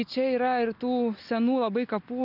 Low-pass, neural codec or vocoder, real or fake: 5.4 kHz; none; real